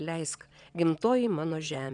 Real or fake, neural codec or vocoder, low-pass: fake; vocoder, 22.05 kHz, 80 mel bands, WaveNeXt; 9.9 kHz